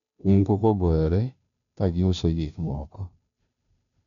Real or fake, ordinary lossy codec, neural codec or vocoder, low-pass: fake; MP3, 96 kbps; codec, 16 kHz, 0.5 kbps, FunCodec, trained on Chinese and English, 25 frames a second; 7.2 kHz